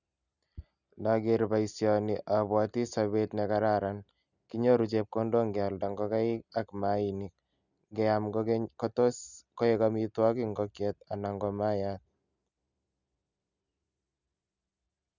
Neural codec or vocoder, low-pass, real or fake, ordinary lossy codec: none; 7.2 kHz; real; none